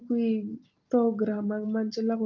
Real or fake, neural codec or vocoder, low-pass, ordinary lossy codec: real; none; 7.2 kHz; Opus, 24 kbps